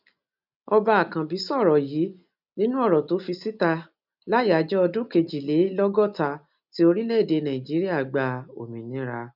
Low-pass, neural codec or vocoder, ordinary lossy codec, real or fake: 5.4 kHz; none; none; real